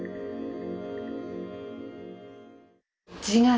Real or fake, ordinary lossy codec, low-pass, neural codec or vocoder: real; none; none; none